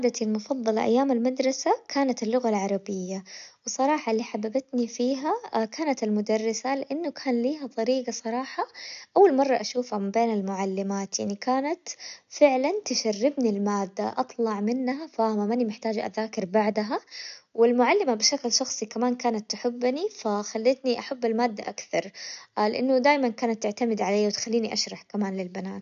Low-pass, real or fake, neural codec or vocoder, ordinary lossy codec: 7.2 kHz; real; none; MP3, 96 kbps